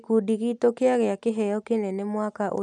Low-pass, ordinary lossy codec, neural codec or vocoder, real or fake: 10.8 kHz; none; none; real